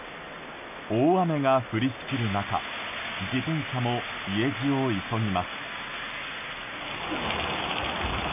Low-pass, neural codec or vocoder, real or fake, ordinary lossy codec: 3.6 kHz; none; real; MP3, 32 kbps